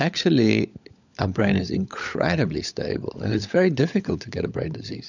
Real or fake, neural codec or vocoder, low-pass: fake; codec, 16 kHz, 16 kbps, FunCodec, trained on LibriTTS, 50 frames a second; 7.2 kHz